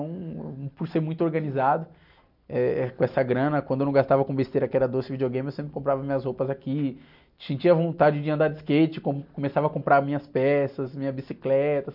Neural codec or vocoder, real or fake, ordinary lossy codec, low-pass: none; real; none; 5.4 kHz